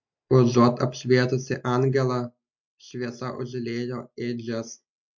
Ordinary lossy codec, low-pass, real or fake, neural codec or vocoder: MP3, 48 kbps; 7.2 kHz; real; none